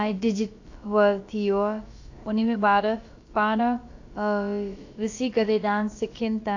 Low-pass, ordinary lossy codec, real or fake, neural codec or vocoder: 7.2 kHz; AAC, 48 kbps; fake; codec, 16 kHz, about 1 kbps, DyCAST, with the encoder's durations